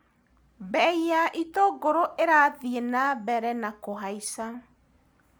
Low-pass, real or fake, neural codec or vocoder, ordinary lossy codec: none; real; none; none